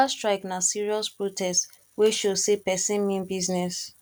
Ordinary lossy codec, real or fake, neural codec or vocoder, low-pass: none; real; none; 19.8 kHz